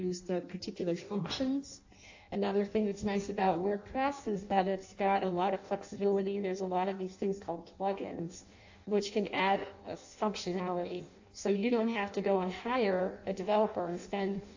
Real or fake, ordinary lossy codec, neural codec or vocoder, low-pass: fake; AAC, 48 kbps; codec, 16 kHz in and 24 kHz out, 0.6 kbps, FireRedTTS-2 codec; 7.2 kHz